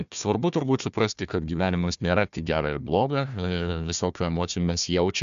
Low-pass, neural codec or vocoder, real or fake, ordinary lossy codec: 7.2 kHz; codec, 16 kHz, 1 kbps, FunCodec, trained on Chinese and English, 50 frames a second; fake; AAC, 96 kbps